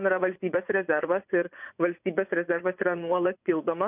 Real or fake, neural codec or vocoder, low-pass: fake; vocoder, 24 kHz, 100 mel bands, Vocos; 3.6 kHz